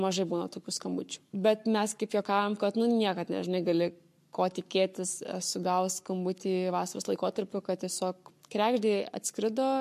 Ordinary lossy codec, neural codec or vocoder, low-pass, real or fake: MP3, 64 kbps; codec, 44.1 kHz, 7.8 kbps, Pupu-Codec; 14.4 kHz; fake